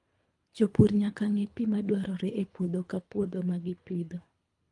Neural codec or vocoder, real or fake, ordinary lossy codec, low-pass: codec, 24 kHz, 3 kbps, HILCodec; fake; Opus, 32 kbps; 10.8 kHz